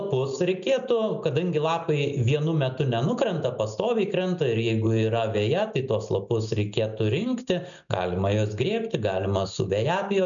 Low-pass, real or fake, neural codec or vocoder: 7.2 kHz; real; none